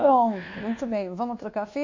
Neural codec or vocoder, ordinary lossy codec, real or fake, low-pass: codec, 24 kHz, 1.2 kbps, DualCodec; MP3, 48 kbps; fake; 7.2 kHz